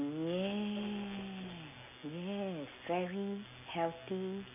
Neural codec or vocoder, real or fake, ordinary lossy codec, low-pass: none; real; none; 3.6 kHz